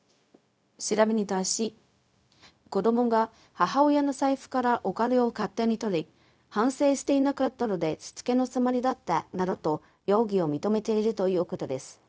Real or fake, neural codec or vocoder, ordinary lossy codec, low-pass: fake; codec, 16 kHz, 0.4 kbps, LongCat-Audio-Codec; none; none